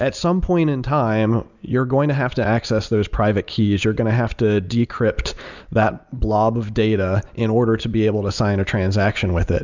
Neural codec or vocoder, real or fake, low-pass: none; real; 7.2 kHz